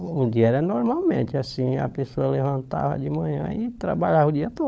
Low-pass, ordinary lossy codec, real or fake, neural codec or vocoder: none; none; fake; codec, 16 kHz, 16 kbps, FunCodec, trained on LibriTTS, 50 frames a second